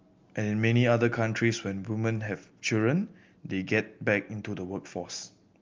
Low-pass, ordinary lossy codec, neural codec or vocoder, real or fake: 7.2 kHz; Opus, 32 kbps; none; real